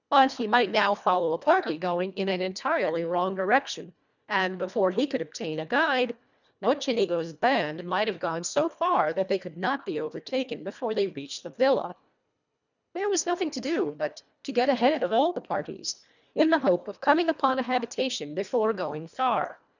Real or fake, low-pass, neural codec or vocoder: fake; 7.2 kHz; codec, 24 kHz, 1.5 kbps, HILCodec